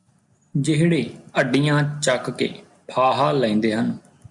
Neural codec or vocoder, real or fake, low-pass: none; real; 10.8 kHz